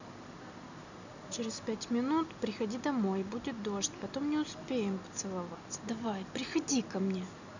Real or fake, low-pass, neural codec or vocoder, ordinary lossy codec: real; 7.2 kHz; none; none